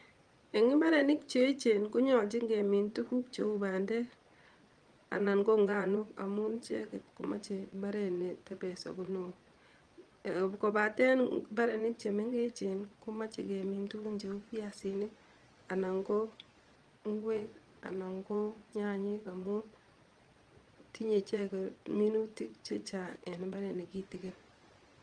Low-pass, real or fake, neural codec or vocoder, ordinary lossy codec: 9.9 kHz; fake; vocoder, 22.05 kHz, 80 mel bands, Vocos; Opus, 32 kbps